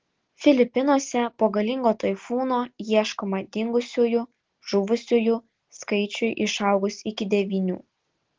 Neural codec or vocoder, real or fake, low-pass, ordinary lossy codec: none; real; 7.2 kHz; Opus, 16 kbps